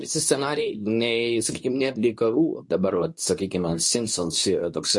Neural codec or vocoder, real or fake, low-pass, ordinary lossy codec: codec, 24 kHz, 0.9 kbps, WavTokenizer, medium speech release version 2; fake; 10.8 kHz; MP3, 48 kbps